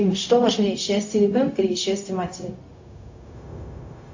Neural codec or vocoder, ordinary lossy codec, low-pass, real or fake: codec, 16 kHz, 0.4 kbps, LongCat-Audio-Codec; AAC, 48 kbps; 7.2 kHz; fake